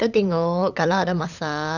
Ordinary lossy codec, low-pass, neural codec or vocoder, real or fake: none; 7.2 kHz; codec, 16 kHz, 8 kbps, FunCodec, trained on LibriTTS, 25 frames a second; fake